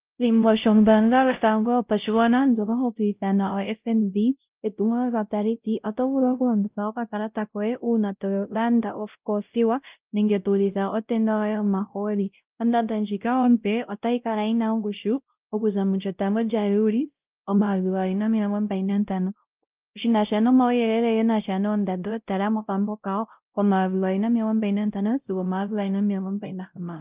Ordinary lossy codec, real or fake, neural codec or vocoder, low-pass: Opus, 24 kbps; fake; codec, 16 kHz, 0.5 kbps, X-Codec, WavLM features, trained on Multilingual LibriSpeech; 3.6 kHz